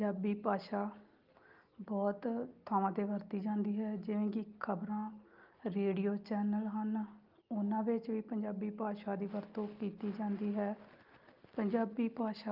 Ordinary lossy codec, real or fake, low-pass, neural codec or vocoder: Opus, 32 kbps; real; 5.4 kHz; none